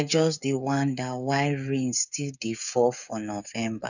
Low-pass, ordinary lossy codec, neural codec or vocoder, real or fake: 7.2 kHz; none; codec, 16 kHz, 8 kbps, FreqCodec, smaller model; fake